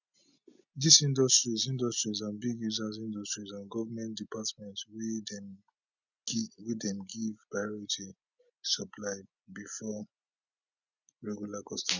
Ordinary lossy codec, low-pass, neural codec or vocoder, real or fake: none; 7.2 kHz; none; real